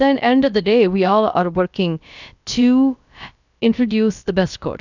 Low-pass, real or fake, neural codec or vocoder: 7.2 kHz; fake; codec, 16 kHz, about 1 kbps, DyCAST, with the encoder's durations